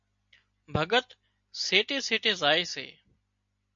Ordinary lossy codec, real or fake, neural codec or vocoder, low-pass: MP3, 48 kbps; real; none; 7.2 kHz